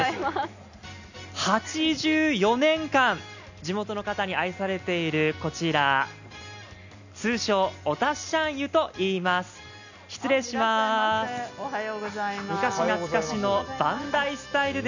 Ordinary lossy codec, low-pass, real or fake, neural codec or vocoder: none; 7.2 kHz; real; none